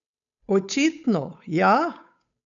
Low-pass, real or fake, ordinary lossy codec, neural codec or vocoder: 7.2 kHz; fake; none; codec, 16 kHz, 8 kbps, FunCodec, trained on Chinese and English, 25 frames a second